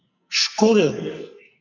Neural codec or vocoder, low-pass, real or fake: codec, 44.1 kHz, 2.6 kbps, SNAC; 7.2 kHz; fake